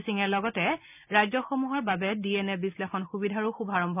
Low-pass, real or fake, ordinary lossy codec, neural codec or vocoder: 3.6 kHz; real; none; none